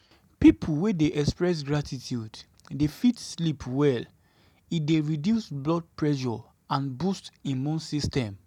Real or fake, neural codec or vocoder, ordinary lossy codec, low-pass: real; none; none; 19.8 kHz